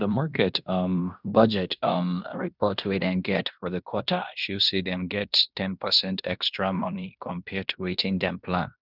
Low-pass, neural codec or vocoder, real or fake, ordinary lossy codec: 5.4 kHz; codec, 16 kHz in and 24 kHz out, 0.9 kbps, LongCat-Audio-Codec, fine tuned four codebook decoder; fake; none